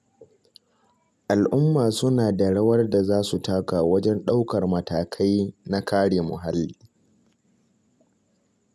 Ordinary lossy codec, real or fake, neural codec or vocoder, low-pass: none; real; none; none